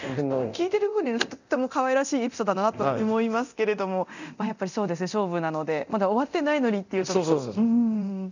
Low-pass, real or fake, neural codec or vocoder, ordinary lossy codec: 7.2 kHz; fake; codec, 24 kHz, 0.9 kbps, DualCodec; none